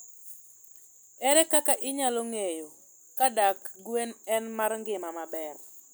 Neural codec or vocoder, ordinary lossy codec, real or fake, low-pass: none; none; real; none